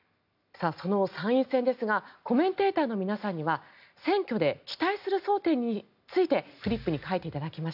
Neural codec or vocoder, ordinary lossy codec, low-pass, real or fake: none; none; 5.4 kHz; real